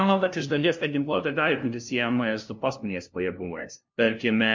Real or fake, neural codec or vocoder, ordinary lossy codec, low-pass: fake; codec, 16 kHz, 0.5 kbps, FunCodec, trained on LibriTTS, 25 frames a second; MP3, 48 kbps; 7.2 kHz